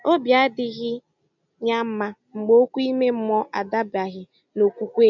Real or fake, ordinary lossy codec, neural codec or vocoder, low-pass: real; none; none; 7.2 kHz